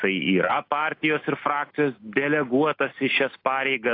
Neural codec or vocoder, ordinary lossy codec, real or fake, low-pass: none; AAC, 32 kbps; real; 5.4 kHz